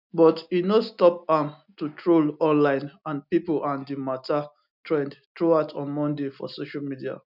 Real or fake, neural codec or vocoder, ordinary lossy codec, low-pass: real; none; none; 5.4 kHz